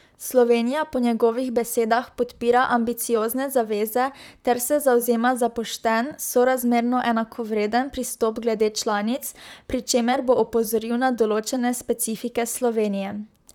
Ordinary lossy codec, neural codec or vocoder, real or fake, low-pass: none; vocoder, 44.1 kHz, 128 mel bands, Pupu-Vocoder; fake; 19.8 kHz